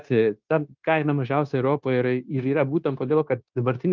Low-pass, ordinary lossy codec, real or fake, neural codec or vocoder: 7.2 kHz; Opus, 24 kbps; fake; codec, 16 kHz, 0.9 kbps, LongCat-Audio-Codec